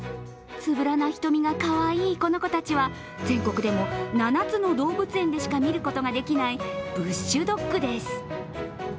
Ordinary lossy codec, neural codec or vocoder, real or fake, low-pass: none; none; real; none